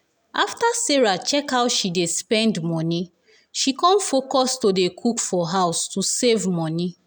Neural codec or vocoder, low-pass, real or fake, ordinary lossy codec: none; none; real; none